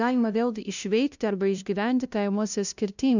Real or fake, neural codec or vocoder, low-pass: fake; codec, 16 kHz, 0.5 kbps, FunCodec, trained on LibriTTS, 25 frames a second; 7.2 kHz